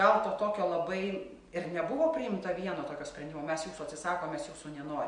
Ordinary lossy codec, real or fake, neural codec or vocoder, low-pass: MP3, 48 kbps; real; none; 9.9 kHz